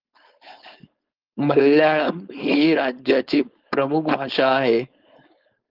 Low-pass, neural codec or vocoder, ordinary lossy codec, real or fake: 5.4 kHz; codec, 16 kHz, 4.8 kbps, FACodec; Opus, 32 kbps; fake